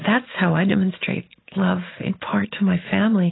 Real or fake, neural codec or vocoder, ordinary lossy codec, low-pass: real; none; AAC, 16 kbps; 7.2 kHz